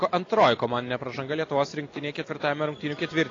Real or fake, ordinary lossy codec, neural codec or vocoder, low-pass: real; AAC, 32 kbps; none; 7.2 kHz